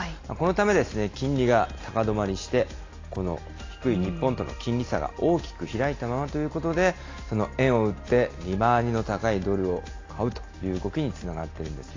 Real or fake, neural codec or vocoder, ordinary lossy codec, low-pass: real; none; AAC, 32 kbps; 7.2 kHz